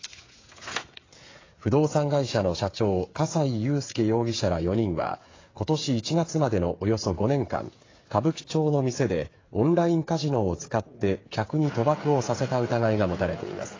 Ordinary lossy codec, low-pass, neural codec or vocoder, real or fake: AAC, 32 kbps; 7.2 kHz; codec, 16 kHz, 8 kbps, FreqCodec, smaller model; fake